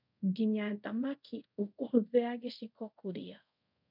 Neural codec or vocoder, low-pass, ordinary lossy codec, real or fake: codec, 24 kHz, 0.5 kbps, DualCodec; 5.4 kHz; none; fake